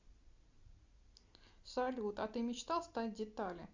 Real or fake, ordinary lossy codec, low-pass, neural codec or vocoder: real; Opus, 64 kbps; 7.2 kHz; none